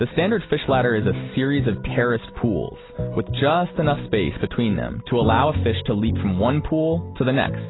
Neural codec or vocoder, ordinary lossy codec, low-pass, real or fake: none; AAC, 16 kbps; 7.2 kHz; real